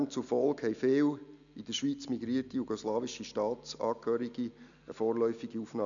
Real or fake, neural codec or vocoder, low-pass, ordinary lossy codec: real; none; 7.2 kHz; none